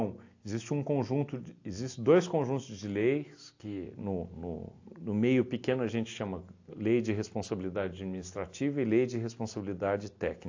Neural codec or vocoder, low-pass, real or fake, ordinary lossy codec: none; 7.2 kHz; real; AAC, 48 kbps